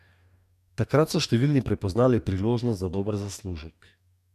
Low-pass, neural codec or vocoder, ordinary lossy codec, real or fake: 14.4 kHz; codec, 44.1 kHz, 2.6 kbps, DAC; none; fake